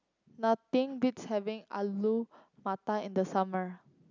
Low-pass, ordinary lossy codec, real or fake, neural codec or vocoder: 7.2 kHz; none; real; none